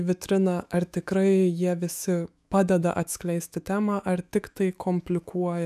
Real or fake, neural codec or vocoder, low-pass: fake; autoencoder, 48 kHz, 128 numbers a frame, DAC-VAE, trained on Japanese speech; 14.4 kHz